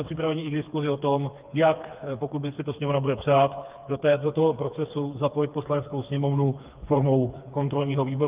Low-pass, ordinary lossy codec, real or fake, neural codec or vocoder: 3.6 kHz; Opus, 24 kbps; fake; codec, 16 kHz, 4 kbps, FreqCodec, smaller model